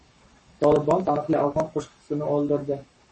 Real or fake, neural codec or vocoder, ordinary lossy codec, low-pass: fake; codec, 44.1 kHz, 7.8 kbps, Pupu-Codec; MP3, 32 kbps; 10.8 kHz